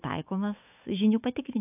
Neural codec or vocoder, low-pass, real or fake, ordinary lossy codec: autoencoder, 48 kHz, 128 numbers a frame, DAC-VAE, trained on Japanese speech; 3.6 kHz; fake; AAC, 32 kbps